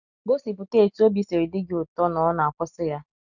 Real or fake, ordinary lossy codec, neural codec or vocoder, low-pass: real; none; none; 7.2 kHz